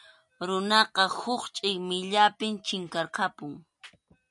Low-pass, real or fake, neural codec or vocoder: 10.8 kHz; real; none